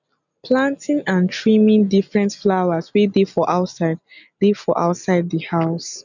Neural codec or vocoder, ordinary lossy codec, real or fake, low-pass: none; none; real; 7.2 kHz